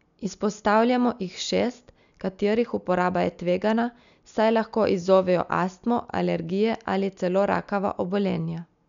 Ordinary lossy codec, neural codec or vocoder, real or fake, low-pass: none; none; real; 7.2 kHz